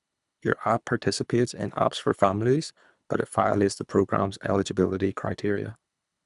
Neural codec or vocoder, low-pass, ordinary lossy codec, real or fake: codec, 24 kHz, 3 kbps, HILCodec; 10.8 kHz; none; fake